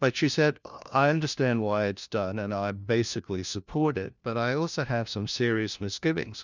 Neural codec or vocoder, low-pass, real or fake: codec, 16 kHz, 1 kbps, FunCodec, trained on LibriTTS, 50 frames a second; 7.2 kHz; fake